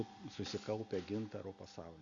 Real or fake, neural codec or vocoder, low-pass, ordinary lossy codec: real; none; 7.2 kHz; Opus, 64 kbps